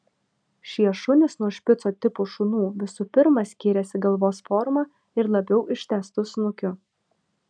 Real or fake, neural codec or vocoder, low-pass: real; none; 9.9 kHz